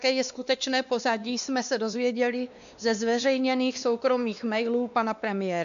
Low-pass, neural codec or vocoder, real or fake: 7.2 kHz; codec, 16 kHz, 2 kbps, X-Codec, WavLM features, trained on Multilingual LibriSpeech; fake